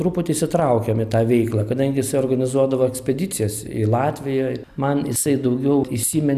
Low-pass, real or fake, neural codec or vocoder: 14.4 kHz; real; none